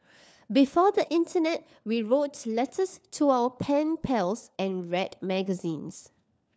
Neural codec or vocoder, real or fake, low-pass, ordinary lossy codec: codec, 16 kHz, 4 kbps, FunCodec, trained on LibriTTS, 50 frames a second; fake; none; none